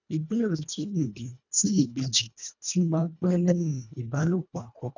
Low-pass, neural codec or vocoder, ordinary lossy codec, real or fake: 7.2 kHz; codec, 24 kHz, 1.5 kbps, HILCodec; none; fake